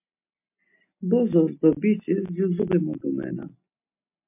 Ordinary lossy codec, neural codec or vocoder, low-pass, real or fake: MP3, 32 kbps; none; 3.6 kHz; real